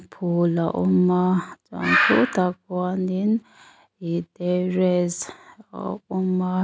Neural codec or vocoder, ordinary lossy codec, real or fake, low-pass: none; none; real; none